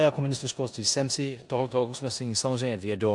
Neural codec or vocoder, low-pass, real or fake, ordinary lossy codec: codec, 16 kHz in and 24 kHz out, 0.9 kbps, LongCat-Audio-Codec, four codebook decoder; 10.8 kHz; fake; AAC, 64 kbps